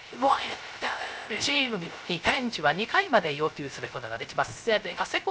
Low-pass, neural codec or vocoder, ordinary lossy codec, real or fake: none; codec, 16 kHz, 0.3 kbps, FocalCodec; none; fake